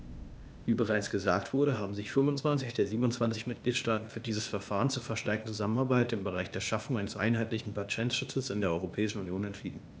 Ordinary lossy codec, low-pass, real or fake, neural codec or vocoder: none; none; fake; codec, 16 kHz, 0.8 kbps, ZipCodec